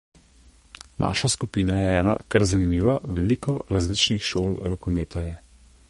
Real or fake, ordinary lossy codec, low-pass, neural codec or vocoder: fake; MP3, 48 kbps; 14.4 kHz; codec, 32 kHz, 1.9 kbps, SNAC